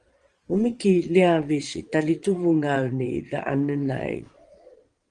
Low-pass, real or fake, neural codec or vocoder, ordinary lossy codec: 9.9 kHz; fake; vocoder, 22.05 kHz, 80 mel bands, Vocos; Opus, 24 kbps